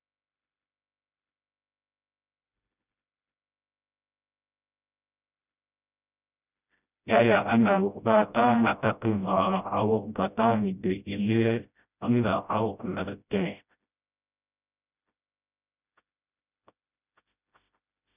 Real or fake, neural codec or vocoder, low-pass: fake; codec, 16 kHz, 0.5 kbps, FreqCodec, smaller model; 3.6 kHz